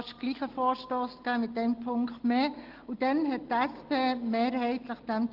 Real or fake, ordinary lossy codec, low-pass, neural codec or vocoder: real; Opus, 16 kbps; 5.4 kHz; none